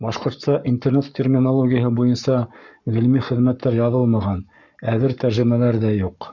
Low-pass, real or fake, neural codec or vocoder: 7.2 kHz; fake; codec, 44.1 kHz, 7.8 kbps, Pupu-Codec